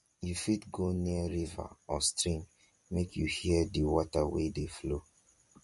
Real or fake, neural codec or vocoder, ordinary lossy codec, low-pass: fake; vocoder, 44.1 kHz, 128 mel bands every 256 samples, BigVGAN v2; MP3, 48 kbps; 14.4 kHz